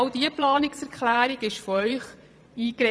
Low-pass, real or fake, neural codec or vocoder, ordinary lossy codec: none; fake; vocoder, 22.05 kHz, 80 mel bands, Vocos; none